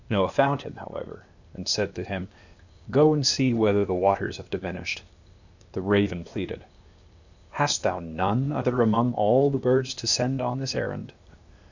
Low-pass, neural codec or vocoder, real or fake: 7.2 kHz; codec, 16 kHz, 0.8 kbps, ZipCodec; fake